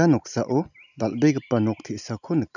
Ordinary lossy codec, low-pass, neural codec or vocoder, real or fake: none; 7.2 kHz; none; real